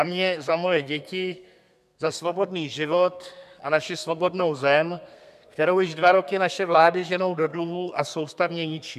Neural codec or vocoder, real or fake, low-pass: codec, 32 kHz, 1.9 kbps, SNAC; fake; 14.4 kHz